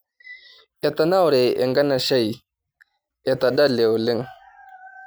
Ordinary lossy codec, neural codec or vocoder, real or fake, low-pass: none; none; real; none